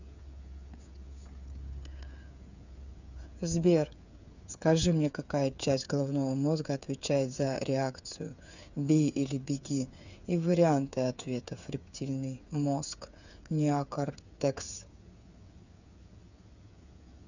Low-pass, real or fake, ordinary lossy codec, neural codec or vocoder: 7.2 kHz; fake; none; codec, 16 kHz, 8 kbps, FreqCodec, smaller model